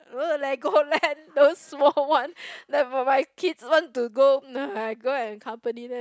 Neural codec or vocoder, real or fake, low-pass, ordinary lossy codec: none; real; none; none